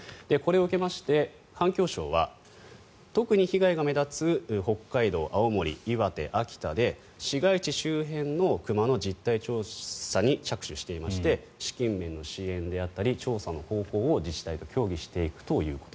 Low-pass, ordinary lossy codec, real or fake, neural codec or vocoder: none; none; real; none